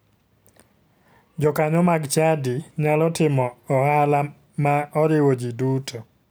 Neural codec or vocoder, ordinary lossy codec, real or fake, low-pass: none; none; real; none